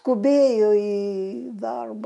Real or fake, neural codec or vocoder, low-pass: real; none; 10.8 kHz